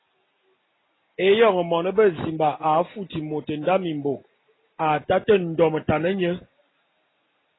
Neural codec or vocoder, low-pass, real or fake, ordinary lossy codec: none; 7.2 kHz; real; AAC, 16 kbps